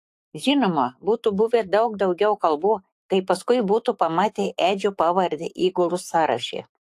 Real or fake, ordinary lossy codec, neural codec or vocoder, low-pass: fake; AAC, 64 kbps; autoencoder, 48 kHz, 128 numbers a frame, DAC-VAE, trained on Japanese speech; 14.4 kHz